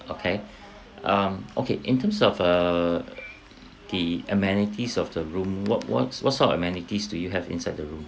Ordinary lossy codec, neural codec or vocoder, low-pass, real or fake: none; none; none; real